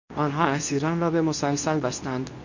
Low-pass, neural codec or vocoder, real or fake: 7.2 kHz; codec, 24 kHz, 0.9 kbps, WavTokenizer, medium speech release version 2; fake